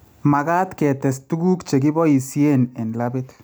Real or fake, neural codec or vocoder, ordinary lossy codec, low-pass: real; none; none; none